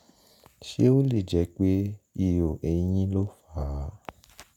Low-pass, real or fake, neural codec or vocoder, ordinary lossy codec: 19.8 kHz; real; none; none